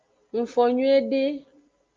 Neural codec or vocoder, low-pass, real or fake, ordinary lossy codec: none; 7.2 kHz; real; Opus, 24 kbps